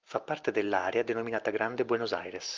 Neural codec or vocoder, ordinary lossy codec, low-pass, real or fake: none; Opus, 24 kbps; 7.2 kHz; real